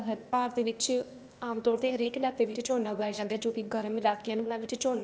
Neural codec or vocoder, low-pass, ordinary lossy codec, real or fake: codec, 16 kHz, 0.8 kbps, ZipCodec; none; none; fake